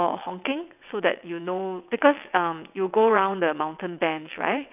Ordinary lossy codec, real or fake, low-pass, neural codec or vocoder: none; fake; 3.6 kHz; vocoder, 22.05 kHz, 80 mel bands, WaveNeXt